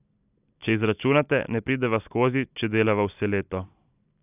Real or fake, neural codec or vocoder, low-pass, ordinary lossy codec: real; none; 3.6 kHz; none